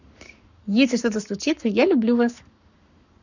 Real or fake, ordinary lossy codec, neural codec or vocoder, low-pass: fake; none; codec, 44.1 kHz, 7.8 kbps, Pupu-Codec; 7.2 kHz